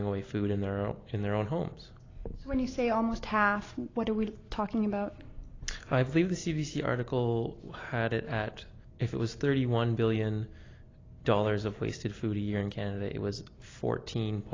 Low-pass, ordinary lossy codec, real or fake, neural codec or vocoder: 7.2 kHz; AAC, 32 kbps; real; none